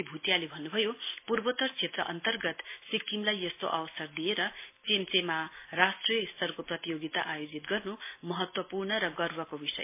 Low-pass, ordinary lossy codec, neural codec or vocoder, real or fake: 3.6 kHz; MP3, 24 kbps; none; real